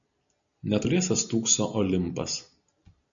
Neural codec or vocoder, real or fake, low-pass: none; real; 7.2 kHz